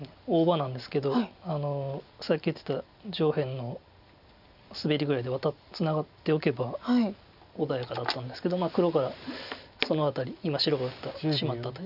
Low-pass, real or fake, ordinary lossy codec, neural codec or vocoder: 5.4 kHz; real; none; none